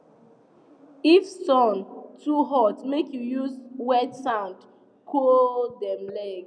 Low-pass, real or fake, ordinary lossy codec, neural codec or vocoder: 9.9 kHz; real; none; none